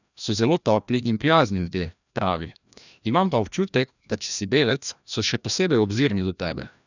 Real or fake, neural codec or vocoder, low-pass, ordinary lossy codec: fake; codec, 16 kHz, 1 kbps, FreqCodec, larger model; 7.2 kHz; none